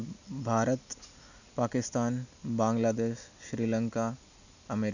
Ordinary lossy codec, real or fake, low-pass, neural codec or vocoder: none; real; 7.2 kHz; none